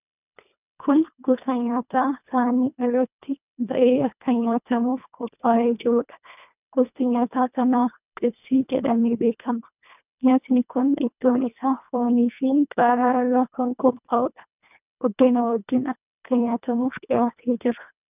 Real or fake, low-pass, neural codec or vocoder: fake; 3.6 kHz; codec, 24 kHz, 1.5 kbps, HILCodec